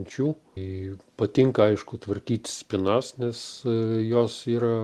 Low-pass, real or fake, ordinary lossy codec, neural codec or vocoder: 14.4 kHz; real; Opus, 24 kbps; none